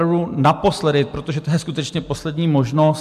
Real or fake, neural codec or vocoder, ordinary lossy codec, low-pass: real; none; AAC, 96 kbps; 14.4 kHz